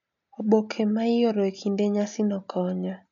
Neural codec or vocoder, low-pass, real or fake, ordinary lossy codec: none; 7.2 kHz; real; none